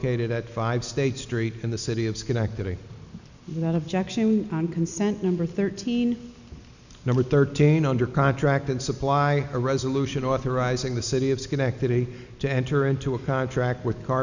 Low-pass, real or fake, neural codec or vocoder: 7.2 kHz; real; none